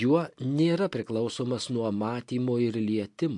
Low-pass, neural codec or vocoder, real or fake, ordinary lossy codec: 10.8 kHz; none; real; MP3, 64 kbps